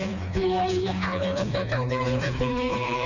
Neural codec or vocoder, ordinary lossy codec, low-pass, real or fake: codec, 16 kHz, 2 kbps, FreqCodec, smaller model; none; 7.2 kHz; fake